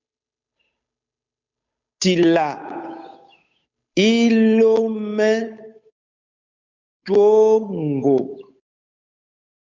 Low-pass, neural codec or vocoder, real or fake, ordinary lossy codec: 7.2 kHz; codec, 16 kHz, 8 kbps, FunCodec, trained on Chinese and English, 25 frames a second; fake; MP3, 64 kbps